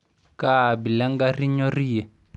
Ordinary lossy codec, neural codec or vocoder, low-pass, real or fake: none; none; 10.8 kHz; real